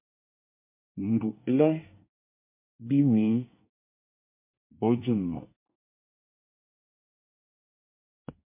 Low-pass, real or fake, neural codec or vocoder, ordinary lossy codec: 3.6 kHz; fake; codec, 24 kHz, 1 kbps, SNAC; MP3, 32 kbps